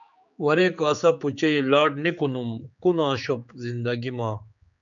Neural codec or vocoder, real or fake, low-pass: codec, 16 kHz, 4 kbps, X-Codec, HuBERT features, trained on general audio; fake; 7.2 kHz